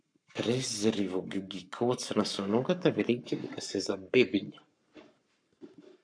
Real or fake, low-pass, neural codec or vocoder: fake; 9.9 kHz; codec, 44.1 kHz, 7.8 kbps, Pupu-Codec